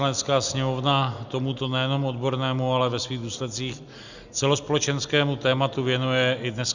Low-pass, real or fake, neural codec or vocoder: 7.2 kHz; real; none